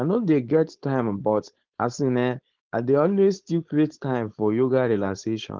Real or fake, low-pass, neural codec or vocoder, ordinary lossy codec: fake; 7.2 kHz; codec, 16 kHz, 4.8 kbps, FACodec; Opus, 16 kbps